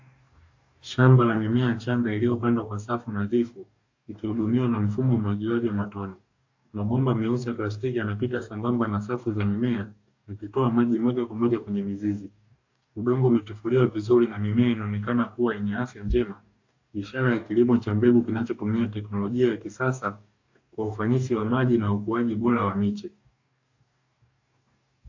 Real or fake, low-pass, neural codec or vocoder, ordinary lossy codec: fake; 7.2 kHz; codec, 44.1 kHz, 2.6 kbps, DAC; AAC, 48 kbps